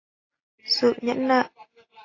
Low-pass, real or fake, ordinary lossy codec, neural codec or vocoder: 7.2 kHz; real; AAC, 32 kbps; none